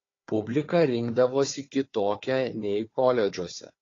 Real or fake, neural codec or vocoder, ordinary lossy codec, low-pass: fake; codec, 16 kHz, 4 kbps, FunCodec, trained on Chinese and English, 50 frames a second; AAC, 32 kbps; 7.2 kHz